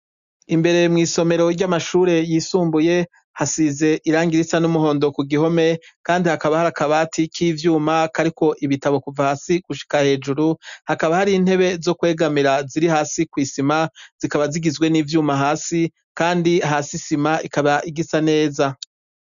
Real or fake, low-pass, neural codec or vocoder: real; 7.2 kHz; none